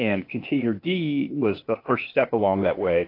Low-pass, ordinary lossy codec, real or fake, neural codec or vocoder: 5.4 kHz; AAC, 32 kbps; fake; codec, 16 kHz, 0.8 kbps, ZipCodec